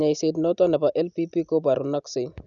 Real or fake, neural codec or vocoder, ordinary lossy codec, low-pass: real; none; none; 7.2 kHz